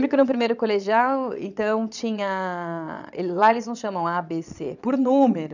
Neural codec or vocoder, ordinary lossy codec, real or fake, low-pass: vocoder, 22.05 kHz, 80 mel bands, Vocos; none; fake; 7.2 kHz